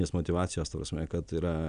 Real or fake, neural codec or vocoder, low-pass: real; none; 9.9 kHz